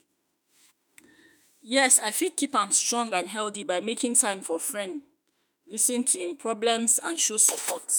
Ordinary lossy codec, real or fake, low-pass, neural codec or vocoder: none; fake; none; autoencoder, 48 kHz, 32 numbers a frame, DAC-VAE, trained on Japanese speech